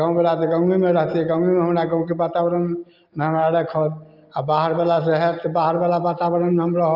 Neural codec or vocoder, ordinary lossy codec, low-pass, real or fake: none; Opus, 24 kbps; 5.4 kHz; real